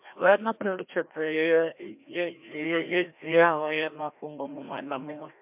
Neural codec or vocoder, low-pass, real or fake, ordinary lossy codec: codec, 16 kHz, 1 kbps, FreqCodec, larger model; 3.6 kHz; fake; MP3, 32 kbps